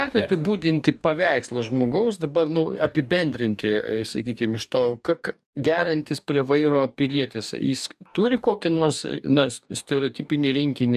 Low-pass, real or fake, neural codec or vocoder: 14.4 kHz; fake; codec, 44.1 kHz, 2.6 kbps, DAC